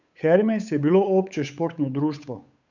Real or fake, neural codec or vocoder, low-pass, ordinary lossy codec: fake; codec, 16 kHz, 8 kbps, FunCodec, trained on Chinese and English, 25 frames a second; 7.2 kHz; none